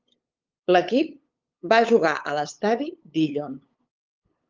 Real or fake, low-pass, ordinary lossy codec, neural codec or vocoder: fake; 7.2 kHz; Opus, 32 kbps; codec, 16 kHz, 8 kbps, FunCodec, trained on LibriTTS, 25 frames a second